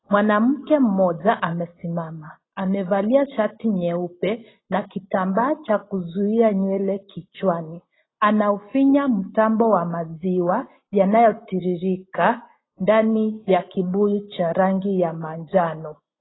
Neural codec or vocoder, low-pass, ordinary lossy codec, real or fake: none; 7.2 kHz; AAC, 16 kbps; real